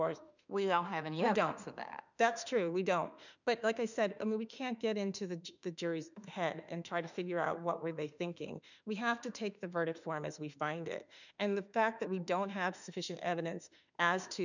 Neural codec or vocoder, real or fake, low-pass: autoencoder, 48 kHz, 32 numbers a frame, DAC-VAE, trained on Japanese speech; fake; 7.2 kHz